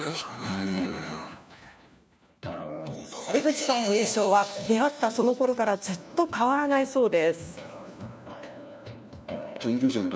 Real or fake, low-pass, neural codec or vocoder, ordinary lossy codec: fake; none; codec, 16 kHz, 1 kbps, FunCodec, trained on LibriTTS, 50 frames a second; none